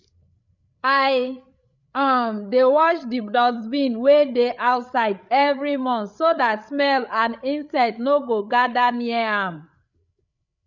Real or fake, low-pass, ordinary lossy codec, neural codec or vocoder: fake; 7.2 kHz; none; codec, 16 kHz, 8 kbps, FreqCodec, larger model